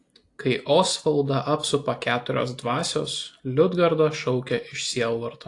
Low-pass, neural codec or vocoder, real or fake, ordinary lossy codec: 10.8 kHz; none; real; AAC, 48 kbps